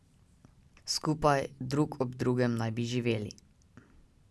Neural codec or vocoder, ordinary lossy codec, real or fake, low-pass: none; none; real; none